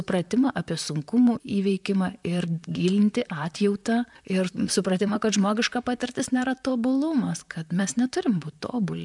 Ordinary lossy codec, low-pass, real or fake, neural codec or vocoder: MP3, 96 kbps; 10.8 kHz; fake; vocoder, 44.1 kHz, 128 mel bands, Pupu-Vocoder